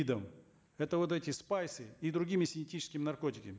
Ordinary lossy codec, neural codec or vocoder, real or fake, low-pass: none; none; real; none